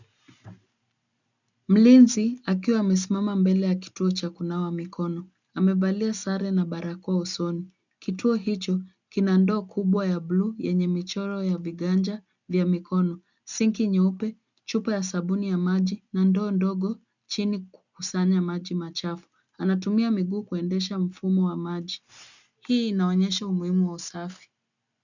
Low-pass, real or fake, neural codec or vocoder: 7.2 kHz; real; none